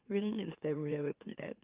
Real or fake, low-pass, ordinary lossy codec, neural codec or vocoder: fake; 3.6 kHz; Opus, 24 kbps; autoencoder, 44.1 kHz, a latent of 192 numbers a frame, MeloTTS